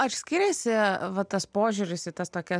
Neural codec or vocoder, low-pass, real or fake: none; 9.9 kHz; real